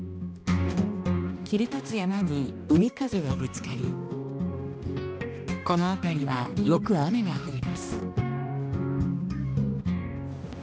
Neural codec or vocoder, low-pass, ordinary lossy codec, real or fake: codec, 16 kHz, 1 kbps, X-Codec, HuBERT features, trained on balanced general audio; none; none; fake